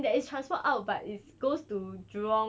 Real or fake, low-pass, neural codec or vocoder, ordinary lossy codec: real; none; none; none